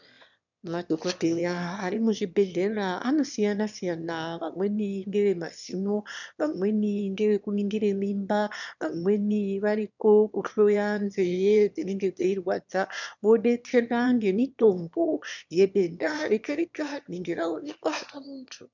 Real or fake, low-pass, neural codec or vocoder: fake; 7.2 kHz; autoencoder, 22.05 kHz, a latent of 192 numbers a frame, VITS, trained on one speaker